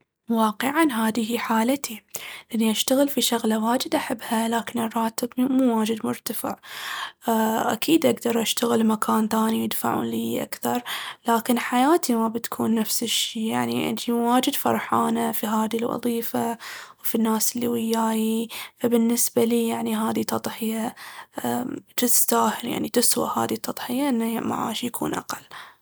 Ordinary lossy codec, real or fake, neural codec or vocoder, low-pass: none; real; none; none